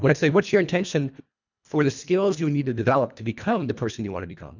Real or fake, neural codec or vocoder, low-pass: fake; codec, 24 kHz, 1.5 kbps, HILCodec; 7.2 kHz